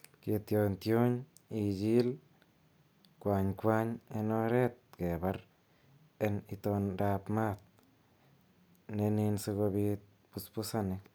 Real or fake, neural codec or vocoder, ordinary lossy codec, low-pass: real; none; none; none